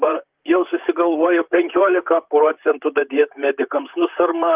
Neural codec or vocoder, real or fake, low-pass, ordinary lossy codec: vocoder, 44.1 kHz, 128 mel bands, Pupu-Vocoder; fake; 3.6 kHz; Opus, 24 kbps